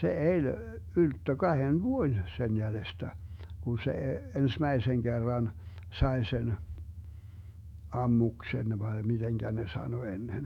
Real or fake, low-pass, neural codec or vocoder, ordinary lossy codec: fake; 19.8 kHz; autoencoder, 48 kHz, 128 numbers a frame, DAC-VAE, trained on Japanese speech; none